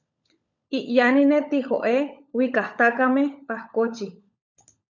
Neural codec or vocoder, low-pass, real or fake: codec, 16 kHz, 16 kbps, FunCodec, trained on LibriTTS, 50 frames a second; 7.2 kHz; fake